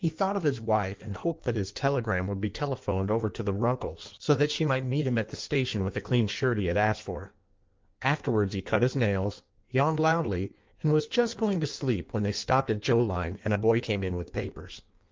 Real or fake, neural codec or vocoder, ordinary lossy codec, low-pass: fake; codec, 16 kHz in and 24 kHz out, 1.1 kbps, FireRedTTS-2 codec; Opus, 32 kbps; 7.2 kHz